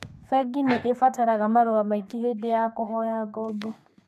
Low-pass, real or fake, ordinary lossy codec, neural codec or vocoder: 14.4 kHz; fake; MP3, 96 kbps; codec, 32 kHz, 1.9 kbps, SNAC